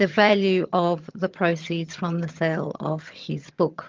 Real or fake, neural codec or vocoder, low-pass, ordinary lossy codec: fake; vocoder, 22.05 kHz, 80 mel bands, HiFi-GAN; 7.2 kHz; Opus, 32 kbps